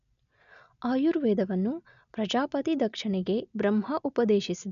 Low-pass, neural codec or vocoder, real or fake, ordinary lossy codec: 7.2 kHz; none; real; none